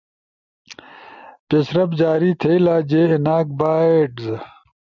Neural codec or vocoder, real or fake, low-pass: none; real; 7.2 kHz